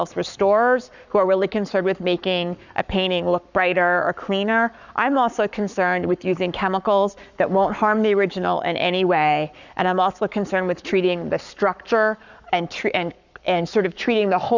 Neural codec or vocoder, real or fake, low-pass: codec, 44.1 kHz, 7.8 kbps, Pupu-Codec; fake; 7.2 kHz